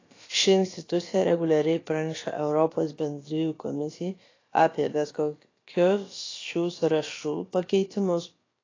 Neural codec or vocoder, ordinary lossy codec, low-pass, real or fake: codec, 16 kHz, about 1 kbps, DyCAST, with the encoder's durations; AAC, 32 kbps; 7.2 kHz; fake